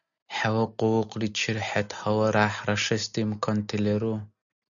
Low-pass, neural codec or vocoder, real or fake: 7.2 kHz; none; real